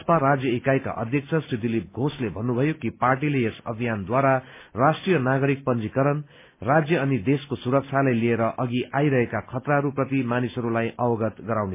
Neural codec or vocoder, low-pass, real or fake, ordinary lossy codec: none; 3.6 kHz; real; MP3, 24 kbps